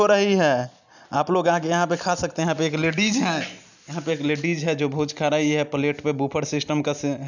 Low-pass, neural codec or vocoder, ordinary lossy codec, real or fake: 7.2 kHz; none; none; real